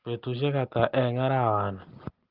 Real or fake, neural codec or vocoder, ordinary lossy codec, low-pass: real; none; Opus, 16 kbps; 5.4 kHz